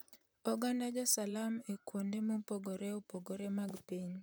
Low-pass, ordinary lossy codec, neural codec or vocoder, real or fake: none; none; vocoder, 44.1 kHz, 128 mel bands, Pupu-Vocoder; fake